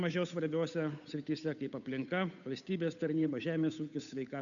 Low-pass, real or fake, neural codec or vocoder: 7.2 kHz; fake; codec, 16 kHz, 8 kbps, FunCodec, trained on Chinese and English, 25 frames a second